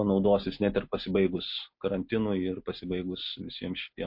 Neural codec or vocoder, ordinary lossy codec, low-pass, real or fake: none; MP3, 32 kbps; 5.4 kHz; real